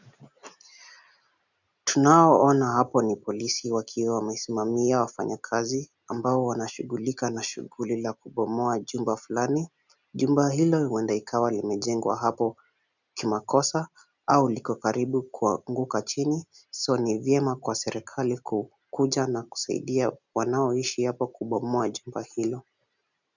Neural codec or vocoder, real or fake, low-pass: none; real; 7.2 kHz